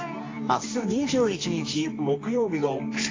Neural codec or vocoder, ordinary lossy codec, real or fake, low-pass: codec, 24 kHz, 0.9 kbps, WavTokenizer, medium music audio release; AAC, 32 kbps; fake; 7.2 kHz